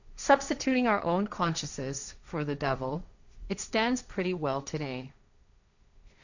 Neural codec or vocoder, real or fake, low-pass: codec, 16 kHz, 1.1 kbps, Voila-Tokenizer; fake; 7.2 kHz